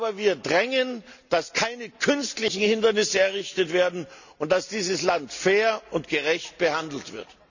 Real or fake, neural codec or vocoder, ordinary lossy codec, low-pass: real; none; none; 7.2 kHz